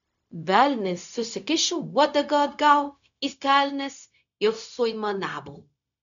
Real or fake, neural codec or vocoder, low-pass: fake; codec, 16 kHz, 0.4 kbps, LongCat-Audio-Codec; 7.2 kHz